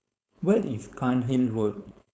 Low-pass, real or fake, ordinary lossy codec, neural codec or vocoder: none; fake; none; codec, 16 kHz, 4.8 kbps, FACodec